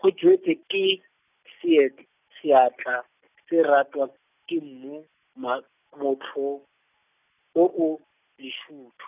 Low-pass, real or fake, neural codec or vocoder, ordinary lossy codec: 3.6 kHz; real; none; none